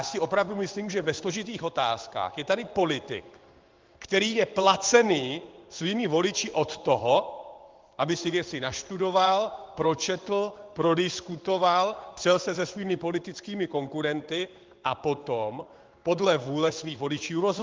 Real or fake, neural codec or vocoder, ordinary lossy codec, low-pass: fake; codec, 16 kHz in and 24 kHz out, 1 kbps, XY-Tokenizer; Opus, 32 kbps; 7.2 kHz